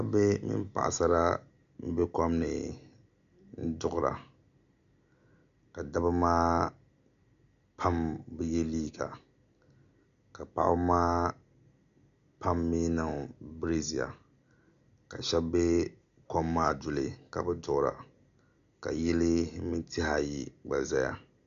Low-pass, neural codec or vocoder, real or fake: 7.2 kHz; none; real